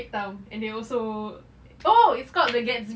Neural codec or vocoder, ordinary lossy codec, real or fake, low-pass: none; none; real; none